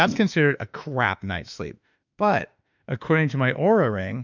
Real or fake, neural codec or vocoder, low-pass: fake; autoencoder, 48 kHz, 32 numbers a frame, DAC-VAE, trained on Japanese speech; 7.2 kHz